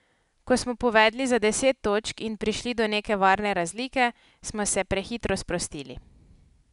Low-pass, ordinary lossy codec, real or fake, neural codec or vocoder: 10.8 kHz; none; real; none